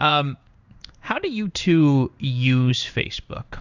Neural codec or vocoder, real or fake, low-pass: codec, 16 kHz in and 24 kHz out, 1 kbps, XY-Tokenizer; fake; 7.2 kHz